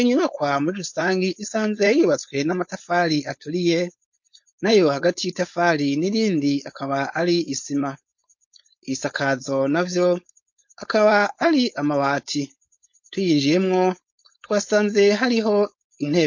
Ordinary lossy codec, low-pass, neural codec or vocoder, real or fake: MP3, 48 kbps; 7.2 kHz; codec, 16 kHz, 4.8 kbps, FACodec; fake